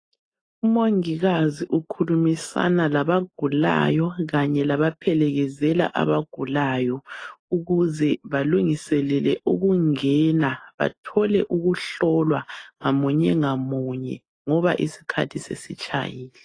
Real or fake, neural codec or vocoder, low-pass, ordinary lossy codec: real; none; 9.9 kHz; AAC, 32 kbps